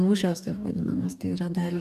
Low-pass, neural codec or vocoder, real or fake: 14.4 kHz; codec, 44.1 kHz, 2.6 kbps, DAC; fake